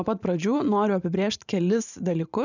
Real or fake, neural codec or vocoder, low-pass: fake; vocoder, 44.1 kHz, 128 mel bands every 512 samples, BigVGAN v2; 7.2 kHz